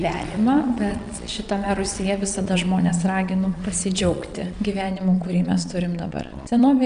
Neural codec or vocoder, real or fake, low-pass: vocoder, 22.05 kHz, 80 mel bands, Vocos; fake; 9.9 kHz